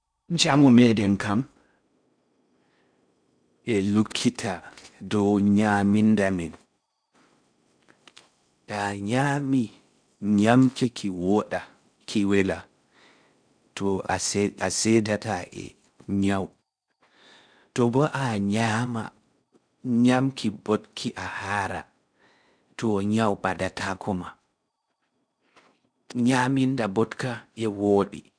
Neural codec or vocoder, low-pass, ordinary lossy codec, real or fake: codec, 16 kHz in and 24 kHz out, 0.6 kbps, FocalCodec, streaming, 4096 codes; 9.9 kHz; none; fake